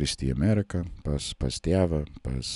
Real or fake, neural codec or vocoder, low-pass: real; none; 10.8 kHz